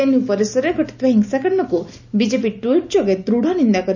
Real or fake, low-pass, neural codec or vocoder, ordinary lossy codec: real; 7.2 kHz; none; none